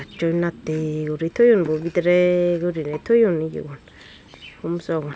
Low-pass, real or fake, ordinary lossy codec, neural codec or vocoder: none; real; none; none